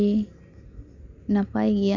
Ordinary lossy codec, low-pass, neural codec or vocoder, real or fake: none; 7.2 kHz; none; real